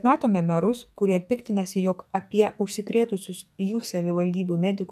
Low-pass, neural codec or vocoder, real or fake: 14.4 kHz; codec, 44.1 kHz, 2.6 kbps, SNAC; fake